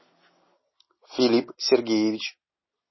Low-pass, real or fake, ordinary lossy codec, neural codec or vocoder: 7.2 kHz; real; MP3, 24 kbps; none